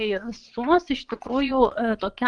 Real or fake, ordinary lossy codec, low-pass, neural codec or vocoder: fake; Opus, 32 kbps; 9.9 kHz; vocoder, 22.05 kHz, 80 mel bands, Vocos